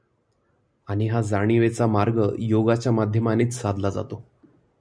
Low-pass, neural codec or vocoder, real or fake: 9.9 kHz; none; real